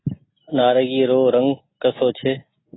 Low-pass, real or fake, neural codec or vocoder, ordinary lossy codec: 7.2 kHz; real; none; AAC, 16 kbps